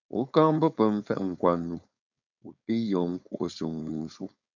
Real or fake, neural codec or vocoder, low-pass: fake; codec, 16 kHz, 4.8 kbps, FACodec; 7.2 kHz